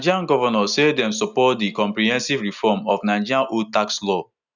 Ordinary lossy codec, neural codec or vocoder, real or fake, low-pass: none; none; real; 7.2 kHz